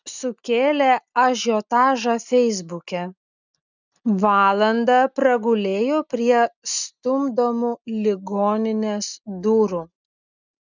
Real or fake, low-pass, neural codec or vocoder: real; 7.2 kHz; none